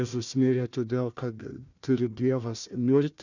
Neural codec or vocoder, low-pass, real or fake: codec, 16 kHz, 1 kbps, FunCodec, trained on Chinese and English, 50 frames a second; 7.2 kHz; fake